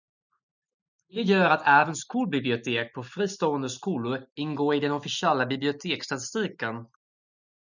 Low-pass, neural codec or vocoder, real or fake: 7.2 kHz; none; real